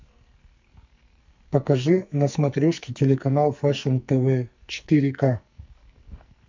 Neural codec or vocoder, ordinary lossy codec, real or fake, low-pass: codec, 44.1 kHz, 2.6 kbps, SNAC; MP3, 64 kbps; fake; 7.2 kHz